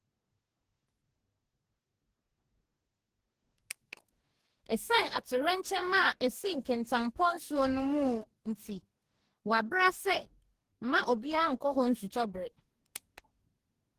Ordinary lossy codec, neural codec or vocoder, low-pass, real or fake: Opus, 16 kbps; codec, 44.1 kHz, 2.6 kbps, DAC; 14.4 kHz; fake